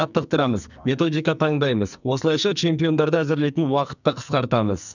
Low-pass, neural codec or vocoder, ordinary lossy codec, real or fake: 7.2 kHz; codec, 44.1 kHz, 2.6 kbps, SNAC; none; fake